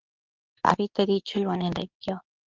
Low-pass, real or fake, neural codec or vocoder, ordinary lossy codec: 7.2 kHz; fake; codec, 24 kHz, 0.9 kbps, WavTokenizer, medium speech release version 2; Opus, 32 kbps